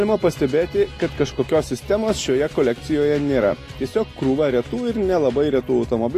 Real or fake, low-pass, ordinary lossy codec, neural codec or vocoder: real; 14.4 kHz; AAC, 48 kbps; none